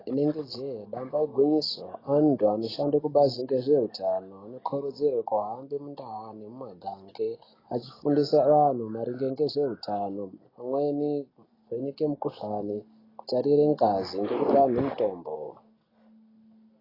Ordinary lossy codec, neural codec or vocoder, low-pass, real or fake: AAC, 24 kbps; none; 5.4 kHz; real